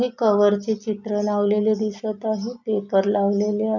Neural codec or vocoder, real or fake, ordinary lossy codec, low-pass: none; real; none; 7.2 kHz